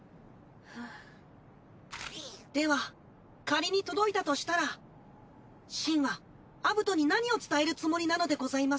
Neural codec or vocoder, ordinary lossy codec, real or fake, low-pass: none; none; real; none